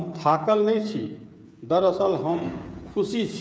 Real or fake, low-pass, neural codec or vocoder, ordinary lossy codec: fake; none; codec, 16 kHz, 8 kbps, FreqCodec, smaller model; none